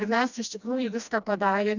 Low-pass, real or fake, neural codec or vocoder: 7.2 kHz; fake; codec, 16 kHz, 1 kbps, FreqCodec, smaller model